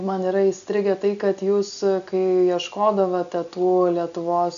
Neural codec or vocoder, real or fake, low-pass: none; real; 7.2 kHz